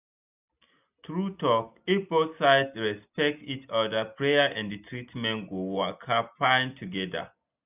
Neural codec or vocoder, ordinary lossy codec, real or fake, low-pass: none; none; real; 3.6 kHz